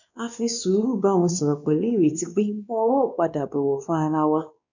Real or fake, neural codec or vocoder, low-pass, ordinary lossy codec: fake; codec, 16 kHz, 2 kbps, X-Codec, WavLM features, trained on Multilingual LibriSpeech; 7.2 kHz; none